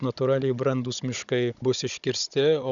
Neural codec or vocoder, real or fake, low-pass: codec, 16 kHz, 16 kbps, FunCodec, trained on Chinese and English, 50 frames a second; fake; 7.2 kHz